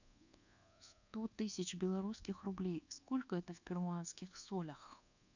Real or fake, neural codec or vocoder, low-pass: fake; codec, 24 kHz, 1.2 kbps, DualCodec; 7.2 kHz